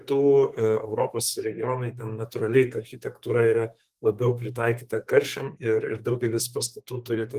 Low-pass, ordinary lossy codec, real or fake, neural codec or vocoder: 14.4 kHz; Opus, 24 kbps; fake; codec, 32 kHz, 1.9 kbps, SNAC